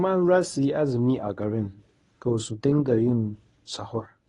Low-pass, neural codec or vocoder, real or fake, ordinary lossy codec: 10.8 kHz; codec, 24 kHz, 0.9 kbps, WavTokenizer, medium speech release version 1; fake; AAC, 32 kbps